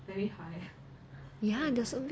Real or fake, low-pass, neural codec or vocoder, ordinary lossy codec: real; none; none; none